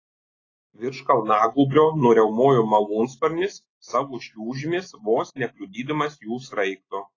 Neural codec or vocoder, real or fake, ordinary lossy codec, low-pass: none; real; AAC, 32 kbps; 7.2 kHz